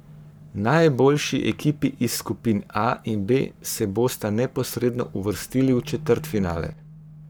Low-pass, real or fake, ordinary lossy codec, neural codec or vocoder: none; fake; none; codec, 44.1 kHz, 7.8 kbps, Pupu-Codec